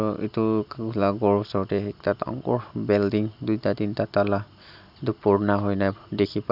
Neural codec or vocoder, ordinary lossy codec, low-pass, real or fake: none; none; 5.4 kHz; real